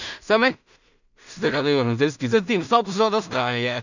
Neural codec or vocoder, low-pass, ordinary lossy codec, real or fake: codec, 16 kHz in and 24 kHz out, 0.4 kbps, LongCat-Audio-Codec, two codebook decoder; 7.2 kHz; none; fake